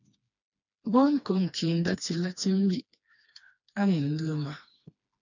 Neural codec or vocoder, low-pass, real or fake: codec, 16 kHz, 2 kbps, FreqCodec, smaller model; 7.2 kHz; fake